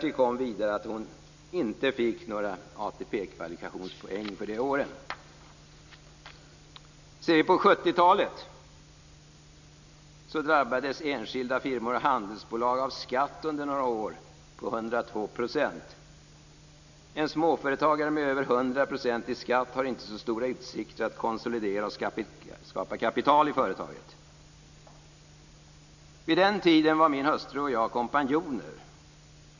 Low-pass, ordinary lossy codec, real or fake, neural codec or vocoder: 7.2 kHz; none; real; none